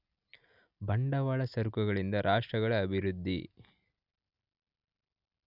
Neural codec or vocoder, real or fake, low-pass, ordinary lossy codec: none; real; 5.4 kHz; none